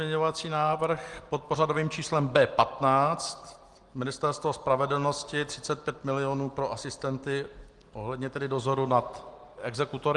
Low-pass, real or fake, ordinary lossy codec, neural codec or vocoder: 10.8 kHz; real; Opus, 24 kbps; none